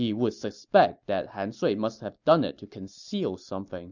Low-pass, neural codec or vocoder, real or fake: 7.2 kHz; none; real